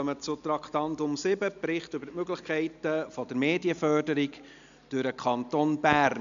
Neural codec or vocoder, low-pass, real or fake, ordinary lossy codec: none; 7.2 kHz; real; none